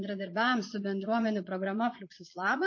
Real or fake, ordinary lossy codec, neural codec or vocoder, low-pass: fake; MP3, 32 kbps; vocoder, 22.05 kHz, 80 mel bands, Vocos; 7.2 kHz